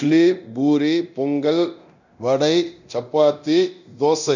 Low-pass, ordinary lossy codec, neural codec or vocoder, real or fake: 7.2 kHz; none; codec, 24 kHz, 0.9 kbps, DualCodec; fake